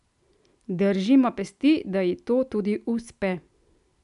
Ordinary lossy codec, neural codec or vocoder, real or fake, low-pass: MP3, 96 kbps; none; real; 10.8 kHz